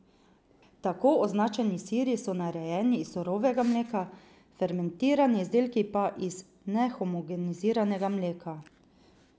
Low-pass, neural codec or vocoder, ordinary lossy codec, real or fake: none; none; none; real